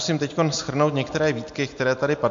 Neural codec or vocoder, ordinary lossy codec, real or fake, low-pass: none; MP3, 48 kbps; real; 7.2 kHz